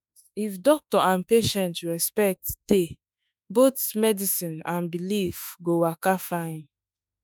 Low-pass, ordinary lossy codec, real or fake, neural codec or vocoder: none; none; fake; autoencoder, 48 kHz, 32 numbers a frame, DAC-VAE, trained on Japanese speech